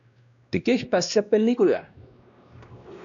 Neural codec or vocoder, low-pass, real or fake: codec, 16 kHz, 1 kbps, X-Codec, WavLM features, trained on Multilingual LibriSpeech; 7.2 kHz; fake